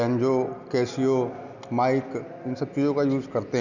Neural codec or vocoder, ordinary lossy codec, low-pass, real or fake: none; none; 7.2 kHz; real